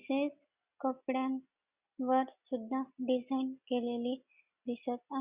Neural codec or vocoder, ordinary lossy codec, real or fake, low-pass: none; Opus, 64 kbps; real; 3.6 kHz